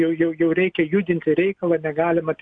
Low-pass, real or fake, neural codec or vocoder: 9.9 kHz; real; none